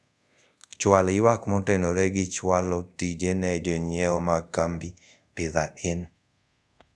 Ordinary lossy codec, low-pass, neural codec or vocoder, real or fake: none; none; codec, 24 kHz, 0.5 kbps, DualCodec; fake